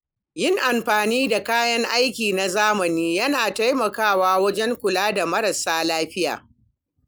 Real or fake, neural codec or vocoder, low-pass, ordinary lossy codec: real; none; none; none